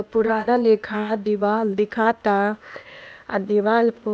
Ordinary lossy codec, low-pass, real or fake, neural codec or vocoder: none; none; fake; codec, 16 kHz, 0.8 kbps, ZipCodec